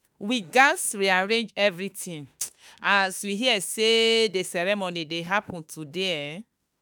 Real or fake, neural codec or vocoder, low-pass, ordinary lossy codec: fake; autoencoder, 48 kHz, 32 numbers a frame, DAC-VAE, trained on Japanese speech; none; none